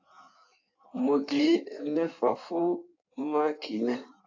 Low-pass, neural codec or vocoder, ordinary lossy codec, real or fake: 7.2 kHz; codec, 16 kHz in and 24 kHz out, 1.1 kbps, FireRedTTS-2 codec; AAC, 48 kbps; fake